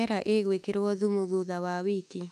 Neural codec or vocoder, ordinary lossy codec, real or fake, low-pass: autoencoder, 48 kHz, 32 numbers a frame, DAC-VAE, trained on Japanese speech; none; fake; 14.4 kHz